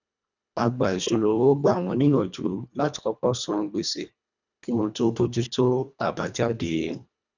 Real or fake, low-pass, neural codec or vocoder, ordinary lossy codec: fake; 7.2 kHz; codec, 24 kHz, 1.5 kbps, HILCodec; none